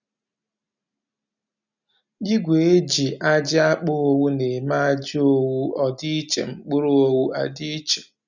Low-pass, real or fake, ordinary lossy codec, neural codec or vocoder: 7.2 kHz; real; none; none